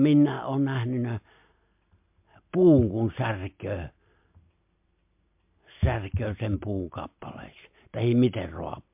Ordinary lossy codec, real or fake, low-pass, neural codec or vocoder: MP3, 32 kbps; real; 3.6 kHz; none